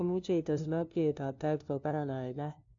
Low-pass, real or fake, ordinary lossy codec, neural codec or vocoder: 7.2 kHz; fake; AAC, 48 kbps; codec, 16 kHz, 0.5 kbps, FunCodec, trained on LibriTTS, 25 frames a second